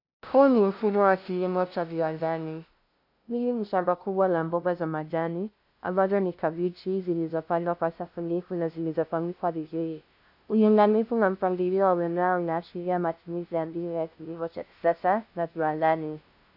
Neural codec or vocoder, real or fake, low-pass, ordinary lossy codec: codec, 16 kHz, 0.5 kbps, FunCodec, trained on LibriTTS, 25 frames a second; fake; 5.4 kHz; AAC, 48 kbps